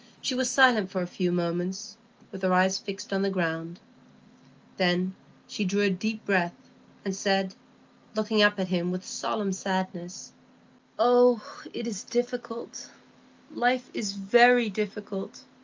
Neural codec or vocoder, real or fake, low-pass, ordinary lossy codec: none; real; 7.2 kHz; Opus, 24 kbps